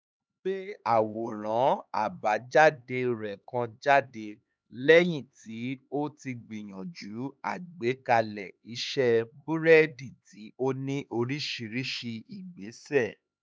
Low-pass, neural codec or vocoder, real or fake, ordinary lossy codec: none; codec, 16 kHz, 4 kbps, X-Codec, HuBERT features, trained on LibriSpeech; fake; none